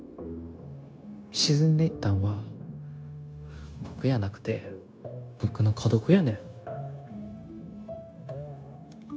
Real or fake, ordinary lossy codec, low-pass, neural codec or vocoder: fake; none; none; codec, 16 kHz, 0.9 kbps, LongCat-Audio-Codec